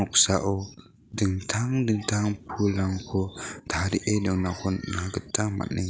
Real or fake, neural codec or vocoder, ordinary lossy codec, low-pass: real; none; none; none